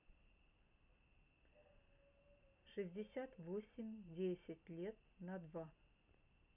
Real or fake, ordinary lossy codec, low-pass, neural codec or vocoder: real; none; 3.6 kHz; none